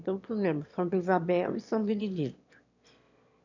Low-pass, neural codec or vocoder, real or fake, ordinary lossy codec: 7.2 kHz; autoencoder, 22.05 kHz, a latent of 192 numbers a frame, VITS, trained on one speaker; fake; none